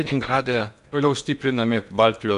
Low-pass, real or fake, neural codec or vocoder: 10.8 kHz; fake; codec, 16 kHz in and 24 kHz out, 0.8 kbps, FocalCodec, streaming, 65536 codes